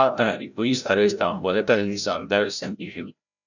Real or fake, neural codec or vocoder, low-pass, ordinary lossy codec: fake; codec, 16 kHz, 0.5 kbps, FreqCodec, larger model; 7.2 kHz; none